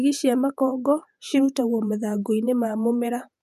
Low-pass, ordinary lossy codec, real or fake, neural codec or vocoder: 14.4 kHz; none; fake; vocoder, 48 kHz, 128 mel bands, Vocos